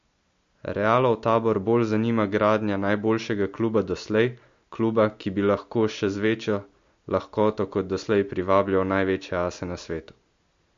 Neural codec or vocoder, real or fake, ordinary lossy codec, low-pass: none; real; MP3, 48 kbps; 7.2 kHz